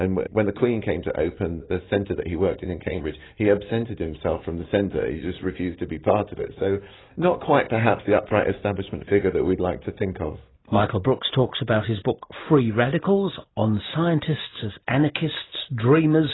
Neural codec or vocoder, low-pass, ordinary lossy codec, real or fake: none; 7.2 kHz; AAC, 16 kbps; real